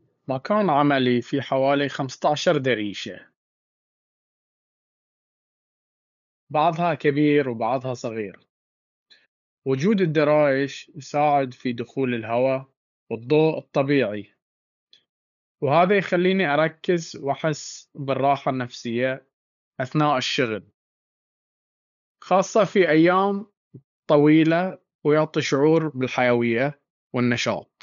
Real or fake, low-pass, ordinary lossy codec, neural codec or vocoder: fake; 7.2 kHz; none; codec, 16 kHz, 8 kbps, FunCodec, trained on LibriTTS, 25 frames a second